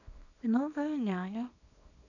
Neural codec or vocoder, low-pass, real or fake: codec, 24 kHz, 0.9 kbps, WavTokenizer, small release; 7.2 kHz; fake